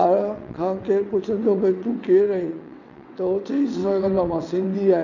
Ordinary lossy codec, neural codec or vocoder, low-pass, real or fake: none; vocoder, 22.05 kHz, 80 mel bands, WaveNeXt; 7.2 kHz; fake